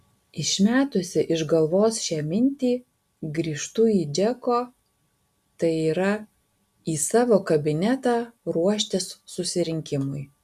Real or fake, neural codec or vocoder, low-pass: real; none; 14.4 kHz